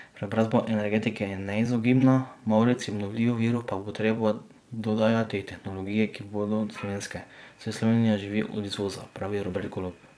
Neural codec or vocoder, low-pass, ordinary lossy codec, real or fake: vocoder, 22.05 kHz, 80 mel bands, Vocos; none; none; fake